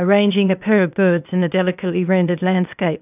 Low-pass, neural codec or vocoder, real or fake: 3.6 kHz; codec, 16 kHz, 0.8 kbps, ZipCodec; fake